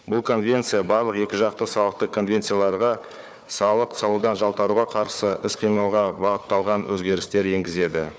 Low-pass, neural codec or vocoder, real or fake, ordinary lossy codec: none; codec, 16 kHz, 4 kbps, FunCodec, trained on Chinese and English, 50 frames a second; fake; none